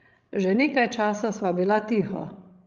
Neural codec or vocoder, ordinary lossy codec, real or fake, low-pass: codec, 16 kHz, 16 kbps, FreqCodec, larger model; Opus, 24 kbps; fake; 7.2 kHz